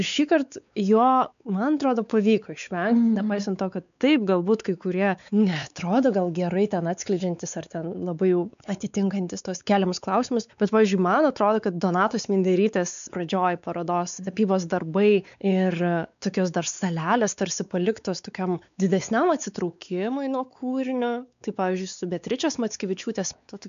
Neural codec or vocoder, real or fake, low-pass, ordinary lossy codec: codec, 16 kHz, 4 kbps, X-Codec, WavLM features, trained on Multilingual LibriSpeech; fake; 7.2 kHz; MP3, 96 kbps